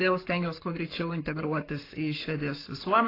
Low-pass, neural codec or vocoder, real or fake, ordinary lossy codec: 5.4 kHz; codec, 44.1 kHz, 7.8 kbps, Pupu-Codec; fake; AAC, 24 kbps